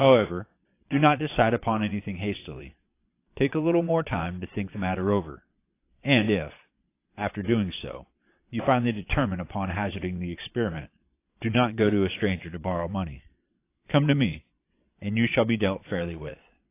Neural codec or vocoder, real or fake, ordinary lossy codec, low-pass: vocoder, 22.05 kHz, 80 mel bands, Vocos; fake; AAC, 24 kbps; 3.6 kHz